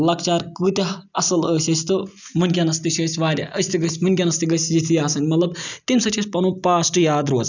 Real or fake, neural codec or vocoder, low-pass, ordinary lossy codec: real; none; 7.2 kHz; none